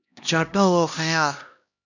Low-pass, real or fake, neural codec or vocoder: 7.2 kHz; fake; codec, 16 kHz, 1 kbps, X-Codec, HuBERT features, trained on LibriSpeech